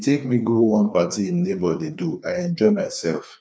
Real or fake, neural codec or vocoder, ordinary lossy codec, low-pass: fake; codec, 16 kHz, 2 kbps, FreqCodec, larger model; none; none